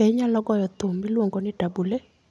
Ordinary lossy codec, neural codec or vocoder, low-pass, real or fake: none; none; none; real